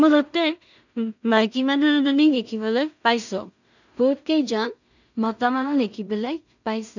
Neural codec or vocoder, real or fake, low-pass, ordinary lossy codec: codec, 16 kHz in and 24 kHz out, 0.4 kbps, LongCat-Audio-Codec, two codebook decoder; fake; 7.2 kHz; none